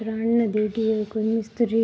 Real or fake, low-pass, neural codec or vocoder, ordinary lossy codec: real; none; none; none